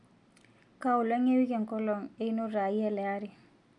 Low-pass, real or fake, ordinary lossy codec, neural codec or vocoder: 10.8 kHz; real; MP3, 96 kbps; none